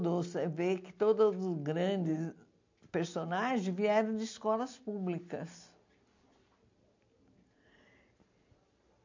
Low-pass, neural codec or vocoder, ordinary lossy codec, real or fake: 7.2 kHz; none; MP3, 64 kbps; real